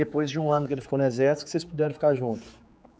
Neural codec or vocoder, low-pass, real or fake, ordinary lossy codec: codec, 16 kHz, 4 kbps, X-Codec, HuBERT features, trained on general audio; none; fake; none